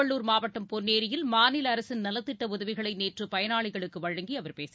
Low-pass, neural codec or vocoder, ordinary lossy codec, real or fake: none; none; none; real